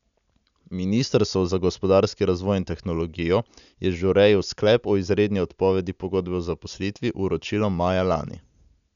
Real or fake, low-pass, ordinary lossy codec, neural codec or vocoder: real; 7.2 kHz; none; none